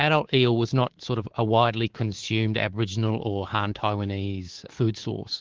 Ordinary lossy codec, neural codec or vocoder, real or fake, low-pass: Opus, 16 kbps; codec, 24 kHz, 3.1 kbps, DualCodec; fake; 7.2 kHz